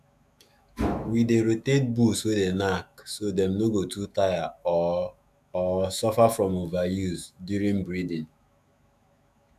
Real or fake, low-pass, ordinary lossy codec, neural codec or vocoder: fake; 14.4 kHz; none; autoencoder, 48 kHz, 128 numbers a frame, DAC-VAE, trained on Japanese speech